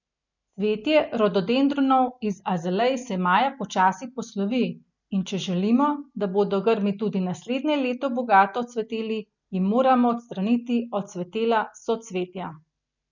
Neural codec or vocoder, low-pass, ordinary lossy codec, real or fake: none; 7.2 kHz; none; real